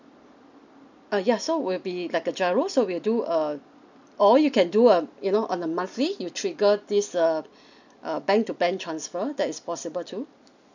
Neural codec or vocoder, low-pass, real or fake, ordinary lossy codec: none; 7.2 kHz; real; none